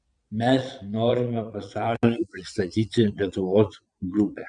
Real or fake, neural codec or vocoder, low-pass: fake; vocoder, 22.05 kHz, 80 mel bands, Vocos; 9.9 kHz